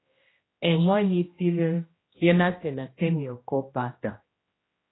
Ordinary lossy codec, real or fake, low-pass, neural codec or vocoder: AAC, 16 kbps; fake; 7.2 kHz; codec, 16 kHz, 1 kbps, X-Codec, HuBERT features, trained on general audio